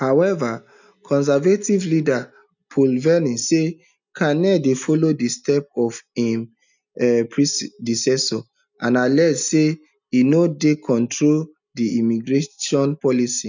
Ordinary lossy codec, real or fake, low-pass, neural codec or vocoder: none; real; 7.2 kHz; none